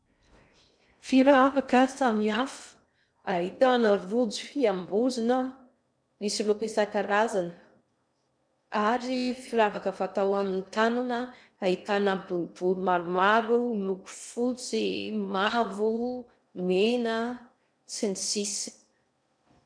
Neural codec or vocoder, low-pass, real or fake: codec, 16 kHz in and 24 kHz out, 0.6 kbps, FocalCodec, streaming, 2048 codes; 9.9 kHz; fake